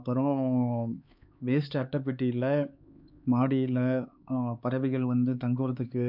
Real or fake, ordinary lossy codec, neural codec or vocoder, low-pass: fake; none; codec, 16 kHz, 4 kbps, X-Codec, HuBERT features, trained on LibriSpeech; 5.4 kHz